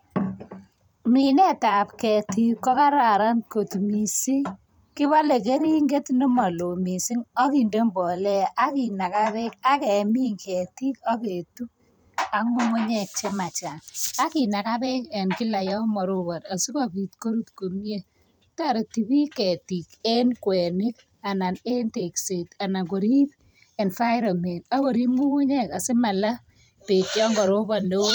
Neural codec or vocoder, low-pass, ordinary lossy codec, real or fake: vocoder, 44.1 kHz, 128 mel bands every 512 samples, BigVGAN v2; none; none; fake